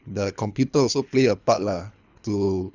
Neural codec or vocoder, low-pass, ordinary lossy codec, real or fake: codec, 24 kHz, 3 kbps, HILCodec; 7.2 kHz; none; fake